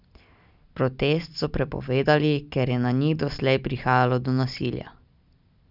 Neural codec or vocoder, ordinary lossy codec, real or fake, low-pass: none; none; real; 5.4 kHz